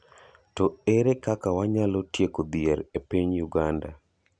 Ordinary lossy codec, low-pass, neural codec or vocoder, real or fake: none; 9.9 kHz; none; real